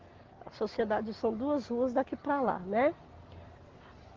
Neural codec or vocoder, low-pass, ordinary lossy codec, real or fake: none; 7.2 kHz; Opus, 16 kbps; real